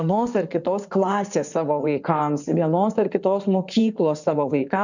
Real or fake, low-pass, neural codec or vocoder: fake; 7.2 kHz; codec, 16 kHz in and 24 kHz out, 2.2 kbps, FireRedTTS-2 codec